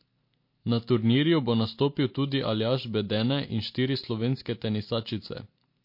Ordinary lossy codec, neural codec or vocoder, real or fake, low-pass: MP3, 32 kbps; none; real; 5.4 kHz